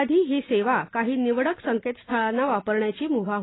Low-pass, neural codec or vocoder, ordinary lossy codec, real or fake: 7.2 kHz; none; AAC, 16 kbps; real